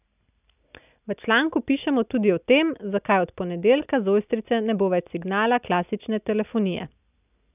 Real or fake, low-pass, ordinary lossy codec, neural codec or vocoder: real; 3.6 kHz; none; none